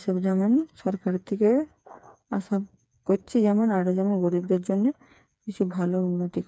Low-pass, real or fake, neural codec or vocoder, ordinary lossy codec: none; fake; codec, 16 kHz, 4 kbps, FreqCodec, smaller model; none